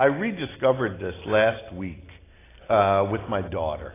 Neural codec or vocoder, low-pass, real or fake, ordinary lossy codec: none; 3.6 kHz; real; AAC, 16 kbps